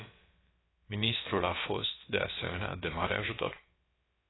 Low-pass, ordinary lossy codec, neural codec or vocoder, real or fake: 7.2 kHz; AAC, 16 kbps; codec, 16 kHz, about 1 kbps, DyCAST, with the encoder's durations; fake